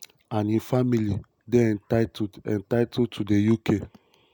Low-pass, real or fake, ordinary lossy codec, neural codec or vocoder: none; real; none; none